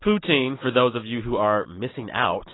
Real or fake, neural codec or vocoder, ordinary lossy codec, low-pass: real; none; AAC, 16 kbps; 7.2 kHz